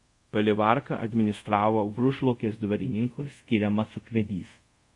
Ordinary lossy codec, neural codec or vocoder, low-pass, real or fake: MP3, 48 kbps; codec, 24 kHz, 0.5 kbps, DualCodec; 10.8 kHz; fake